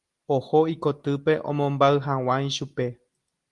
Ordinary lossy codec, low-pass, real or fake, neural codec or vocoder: Opus, 24 kbps; 10.8 kHz; real; none